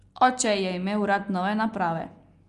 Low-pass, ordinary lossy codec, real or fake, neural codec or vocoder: 10.8 kHz; Opus, 32 kbps; real; none